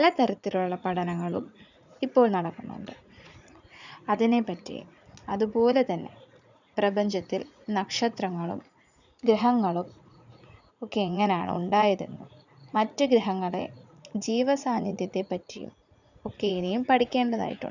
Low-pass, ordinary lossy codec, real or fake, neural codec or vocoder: 7.2 kHz; none; fake; vocoder, 44.1 kHz, 80 mel bands, Vocos